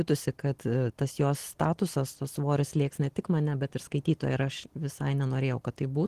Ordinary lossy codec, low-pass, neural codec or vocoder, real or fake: Opus, 16 kbps; 14.4 kHz; none; real